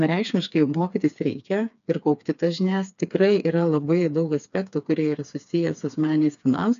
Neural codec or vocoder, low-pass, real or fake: codec, 16 kHz, 4 kbps, FreqCodec, smaller model; 7.2 kHz; fake